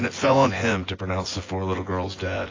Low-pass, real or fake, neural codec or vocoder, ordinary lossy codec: 7.2 kHz; fake; vocoder, 24 kHz, 100 mel bands, Vocos; AAC, 32 kbps